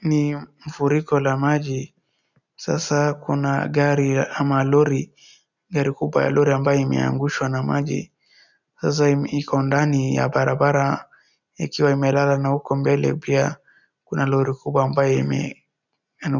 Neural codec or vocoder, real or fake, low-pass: none; real; 7.2 kHz